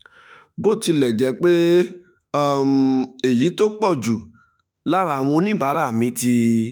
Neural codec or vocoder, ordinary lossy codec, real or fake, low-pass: autoencoder, 48 kHz, 32 numbers a frame, DAC-VAE, trained on Japanese speech; none; fake; none